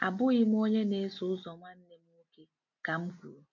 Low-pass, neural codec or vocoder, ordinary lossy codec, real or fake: 7.2 kHz; none; none; real